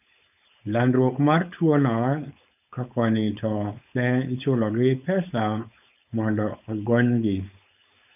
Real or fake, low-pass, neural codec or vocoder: fake; 3.6 kHz; codec, 16 kHz, 4.8 kbps, FACodec